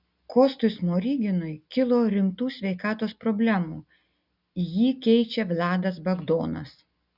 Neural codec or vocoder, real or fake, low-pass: none; real; 5.4 kHz